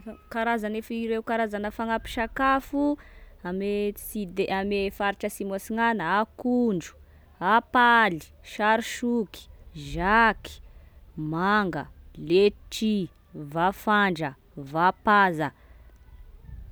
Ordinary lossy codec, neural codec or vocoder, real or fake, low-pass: none; none; real; none